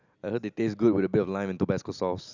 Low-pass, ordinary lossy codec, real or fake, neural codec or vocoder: 7.2 kHz; none; real; none